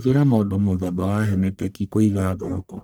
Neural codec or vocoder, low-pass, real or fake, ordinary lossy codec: codec, 44.1 kHz, 1.7 kbps, Pupu-Codec; none; fake; none